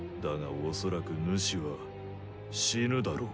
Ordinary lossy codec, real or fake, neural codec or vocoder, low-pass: none; real; none; none